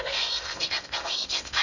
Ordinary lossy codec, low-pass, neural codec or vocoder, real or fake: none; 7.2 kHz; codec, 16 kHz in and 24 kHz out, 0.6 kbps, FocalCodec, streaming, 4096 codes; fake